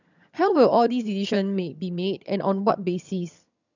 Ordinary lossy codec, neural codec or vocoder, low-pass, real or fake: none; vocoder, 22.05 kHz, 80 mel bands, HiFi-GAN; 7.2 kHz; fake